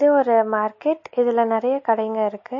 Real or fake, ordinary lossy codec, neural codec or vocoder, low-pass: real; MP3, 32 kbps; none; 7.2 kHz